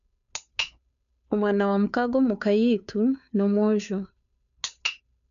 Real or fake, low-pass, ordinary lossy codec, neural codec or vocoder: fake; 7.2 kHz; none; codec, 16 kHz, 2 kbps, FunCodec, trained on Chinese and English, 25 frames a second